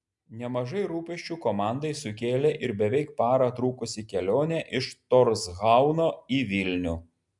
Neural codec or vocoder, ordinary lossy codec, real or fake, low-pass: none; MP3, 96 kbps; real; 10.8 kHz